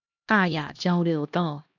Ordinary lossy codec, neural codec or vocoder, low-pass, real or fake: AAC, 48 kbps; codec, 16 kHz, 1 kbps, X-Codec, HuBERT features, trained on LibriSpeech; 7.2 kHz; fake